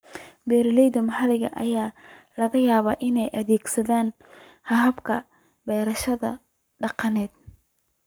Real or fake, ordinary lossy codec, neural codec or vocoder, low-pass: fake; none; codec, 44.1 kHz, 7.8 kbps, Pupu-Codec; none